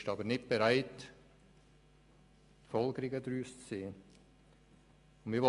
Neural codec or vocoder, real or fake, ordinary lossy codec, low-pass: none; real; MP3, 64 kbps; 10.8 kHz